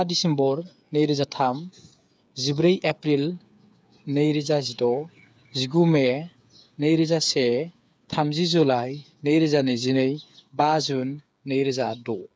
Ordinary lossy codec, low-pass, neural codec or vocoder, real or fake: none; none; codec, 16 kHz, 8 kbps, FreqCodec, smaller model; fake